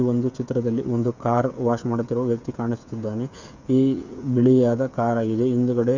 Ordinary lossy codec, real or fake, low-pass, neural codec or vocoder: Opus, 64 kbps; fake; 7.2 kHz; codec, 16 kHz, 8 kbps, FreqCodec, smaller model